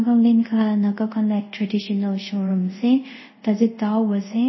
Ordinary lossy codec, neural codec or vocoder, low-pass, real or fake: MP3, 24 kbps; codec, 24 kHz, 0.5 kbps, DualCodec; 7.2 kHz; fake